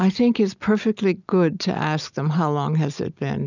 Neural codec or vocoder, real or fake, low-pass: none; real; 7.2 kHz